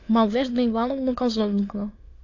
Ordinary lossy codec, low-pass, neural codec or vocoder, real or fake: AAC, 48 kbps; 7.2 kHz; autoencoder, 22.05 kHz, a latent of 192 numbers a frame, VITS, trained on many speakers; fake